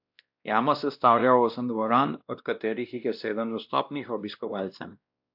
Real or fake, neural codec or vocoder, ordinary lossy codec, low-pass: fake; codec, 16 kHz, 1 kbps, X-Codec, WavLM features, trained on Multilingual LibriSpeech; AAC, 48 kbps; 5.4 kHz